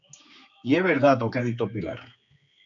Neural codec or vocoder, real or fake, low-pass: codec, 16 kHz, 4 kbps, X-Codec, HuBERT features, trained on general audio; fake; 7.2 kHz